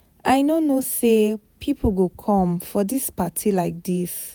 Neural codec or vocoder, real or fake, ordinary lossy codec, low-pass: vocoder, 48 kHz, 128 mel bands, Vocos; fake; none; none